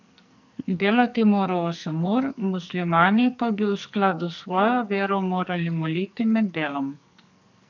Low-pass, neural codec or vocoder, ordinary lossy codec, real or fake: 7.2 kHz; codec, 44.1 kHz, 2.6 kbps, SNAC; none; fake